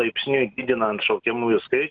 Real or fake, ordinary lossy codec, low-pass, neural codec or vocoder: real; Opus, 24 kbps; 7.2 kHz; none